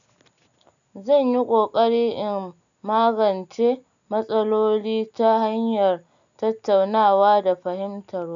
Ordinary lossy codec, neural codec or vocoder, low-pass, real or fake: none; none; 7.2 kHz; real